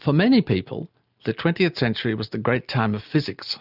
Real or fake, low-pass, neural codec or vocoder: real; 5.4 kHz; none